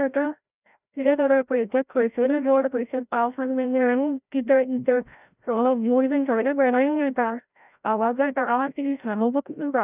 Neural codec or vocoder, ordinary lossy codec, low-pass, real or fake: codec, 16 kHz, 0.5 kbps, FreqCodec, larger model; none; 3.6 kHz; fake